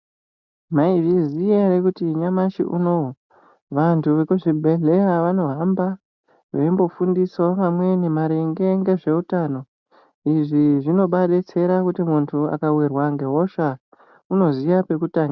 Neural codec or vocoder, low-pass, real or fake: none; 7.2 kHz; real